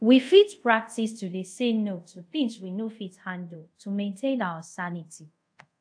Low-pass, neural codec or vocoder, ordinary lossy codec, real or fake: 9.9 kHz; codec, 24 kHz, 0.5 kbps, DualCodec; AAC, 64 kbps; fake